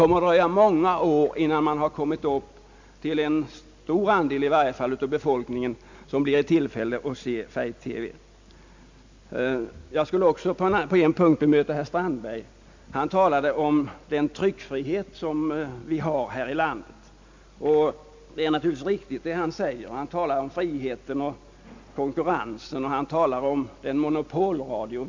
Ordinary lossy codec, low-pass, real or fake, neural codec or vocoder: MP3, 64 kbps; 7.2 kHz; real; none